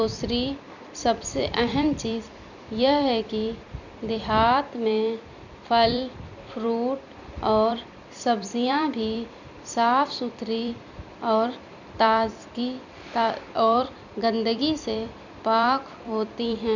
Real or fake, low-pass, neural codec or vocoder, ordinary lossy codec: fake; 7.2 kHz; vocoder, 44.1 kHz, 128 mel bands every 256 samples, BigVGAN v2; none